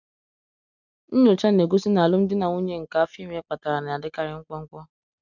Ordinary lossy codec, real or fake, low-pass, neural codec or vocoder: none; real; 7.2 kHz; none